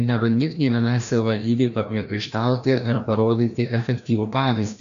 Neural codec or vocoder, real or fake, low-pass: codec, 16 kHz, 1 kbps, FreqCodec, larger model; fake; 7.2 kHz